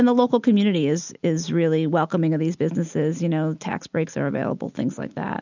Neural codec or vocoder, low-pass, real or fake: none; 7.2 kHz; real